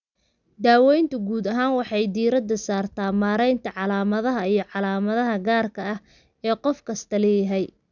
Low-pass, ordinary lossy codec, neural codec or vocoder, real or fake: 7.2 kHz; none; none; real